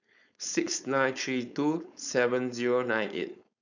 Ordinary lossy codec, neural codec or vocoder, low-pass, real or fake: none; codec, 16 kHz, 4.8 kbps, FACodec; 7.2 kHz; fake